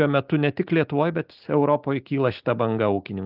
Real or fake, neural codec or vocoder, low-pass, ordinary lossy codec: real; none; 5.4 kHz; Opus, 24 kbps